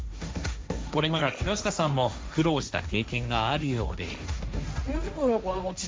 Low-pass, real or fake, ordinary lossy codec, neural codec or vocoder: none; fake; none; codec, 16 kHz, 1.1 kbps, Voila-Tokenizer